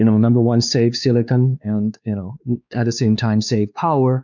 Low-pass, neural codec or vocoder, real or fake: 7.2 kHz; codec, 16 kHz, 2 kbps, X-Codec, WavLM features, trained on Multilingual LibriSpeech; fake